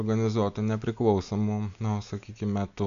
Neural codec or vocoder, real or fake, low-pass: none; real; 7.2 kHz